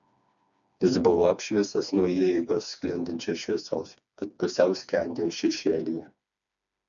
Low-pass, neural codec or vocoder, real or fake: 7.2 kHz; codec, 16 kHz, 2 kbps, FreqCodec, smaller model; fake